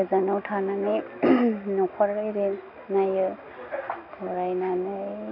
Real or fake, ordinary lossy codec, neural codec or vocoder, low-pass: real; none; none; 5.4 kHz